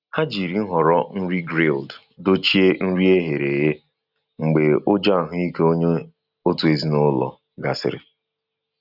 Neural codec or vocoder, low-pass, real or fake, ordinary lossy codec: none; 5.4 kHz; real; none